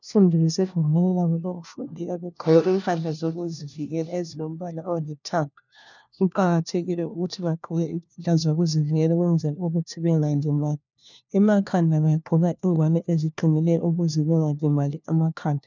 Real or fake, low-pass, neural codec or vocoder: fake; 7.2 kHz; codec, 16 kHz, 1 kbps, FunCodec, trained on LibriTTS, 50 frames a second